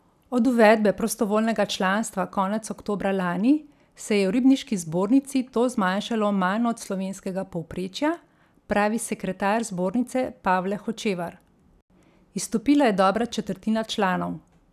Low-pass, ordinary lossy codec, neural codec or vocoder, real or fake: 14.4 kHz; none; none; real